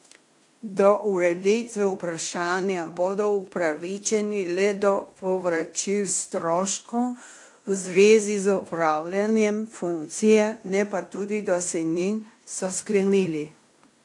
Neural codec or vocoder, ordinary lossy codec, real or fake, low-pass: codec, 16 kHz in and 24 kHz out, 0.9 kbps, LongCat-Audio-Codec, fine tuned four codebook decoder; AAC, 64 kbps; fake; 10.8 kHz